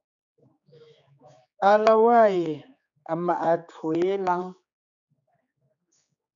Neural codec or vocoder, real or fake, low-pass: codec, 16 kHz, 4 kbps, X-Codec, HuBERT features, trained on general audio; fake; 7.2 kHz